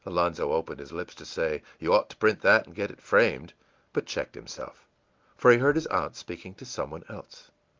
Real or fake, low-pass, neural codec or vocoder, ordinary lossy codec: real; 7.2 kHz; none; Opus, 24 kbps